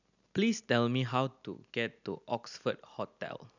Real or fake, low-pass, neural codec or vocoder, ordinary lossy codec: real; 7.2 kHz; none; none